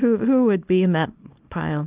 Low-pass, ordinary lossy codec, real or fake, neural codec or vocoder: 3.6 kHz; Opus, 32 kbps; fake; codec, 24 kHz, 0.9 kbps, WavTokenizer, small release